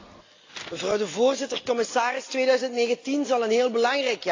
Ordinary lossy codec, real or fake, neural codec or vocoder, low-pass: AAC, 32 kbps; real; none; 7.2 kHz